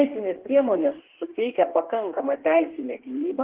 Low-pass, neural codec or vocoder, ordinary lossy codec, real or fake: 3.6 kHz; codec, 16 kHz in and 24 kHz out, 1.1 kbps, FireRedTTS-2 codec; Opus, 16 kbps; fake